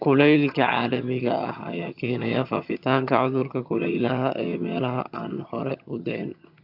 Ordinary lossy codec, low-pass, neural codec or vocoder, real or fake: AAC, 48 kbps; 5.4 kHz; vocoder, 22.05 kHz, 80 mel bands, HiFi-GAN; fake